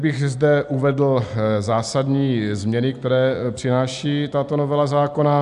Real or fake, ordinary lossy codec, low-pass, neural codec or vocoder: real; AAC, 96 kbps; 10.8 kHz; none